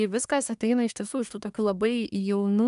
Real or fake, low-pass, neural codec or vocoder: fake; 10.8 kHz; codec, 24 kHz, 1 kbps, SNAC